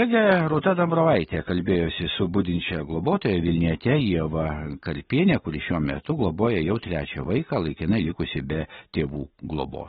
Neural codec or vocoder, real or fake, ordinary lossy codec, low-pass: none; real; AAC, 16 kbps; 7.2 kHz